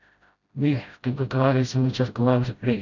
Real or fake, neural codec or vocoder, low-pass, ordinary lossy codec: fake; codec, 16 kHz, 0.5 kbps, FreqCodec, smaller model; 7.2 kHz; none